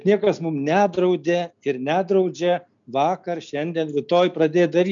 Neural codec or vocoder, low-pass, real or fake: none; 7.2 kHz; real